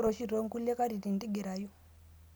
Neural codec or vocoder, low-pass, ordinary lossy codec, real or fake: none; none; none; real